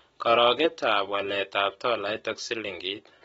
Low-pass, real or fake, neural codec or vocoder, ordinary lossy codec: 10.8 kHz; real; none; AAC, 24 kbps